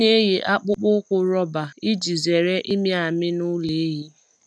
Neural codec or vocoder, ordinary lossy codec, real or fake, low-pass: none; none; real; none